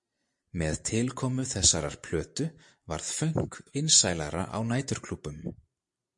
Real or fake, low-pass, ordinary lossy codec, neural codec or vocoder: fake; 10.8 kHz; MP3, 48 kbps; vocoder, 44.1 kHz, 128 mel bands every 512 samples, BigVGAN v2